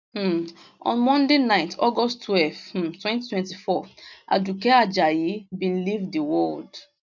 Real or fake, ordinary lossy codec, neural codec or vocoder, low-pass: real; none; none; 7.2 kHz